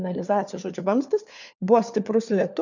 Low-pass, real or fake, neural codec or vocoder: 7.2 kHz; fake; codec, 16 kHz, 4 kbps, FunCodec, trained on LibriTTS, 50 frames a second